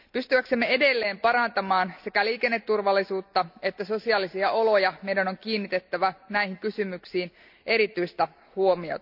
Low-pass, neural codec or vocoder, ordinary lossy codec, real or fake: 5.4 kHz; none; none; real